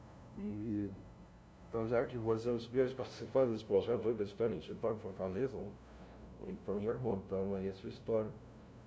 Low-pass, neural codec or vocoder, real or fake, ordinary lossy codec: none; codec, 16 kHz, 0.5 kbps, FunCodec, trained on LibriTTS, 25 frames a second; fake; none